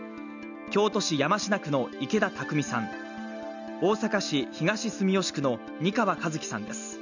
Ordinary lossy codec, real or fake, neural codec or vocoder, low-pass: none; real; none; 7.2 kHz